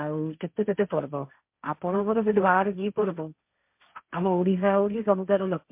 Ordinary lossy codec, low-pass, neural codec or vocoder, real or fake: MP3, 32 kbps; 3.6 kHz; codec, 16 kHz, 1.1 kbps, Voila-Tokenizer; fake